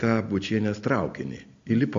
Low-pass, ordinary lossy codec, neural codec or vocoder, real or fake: 7.2 kHz; MP3, 48 kbps; none; real